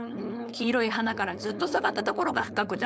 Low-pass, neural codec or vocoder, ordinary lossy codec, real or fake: none; codec, 16 kHz, 4.8 kbps, FACodec; none; fake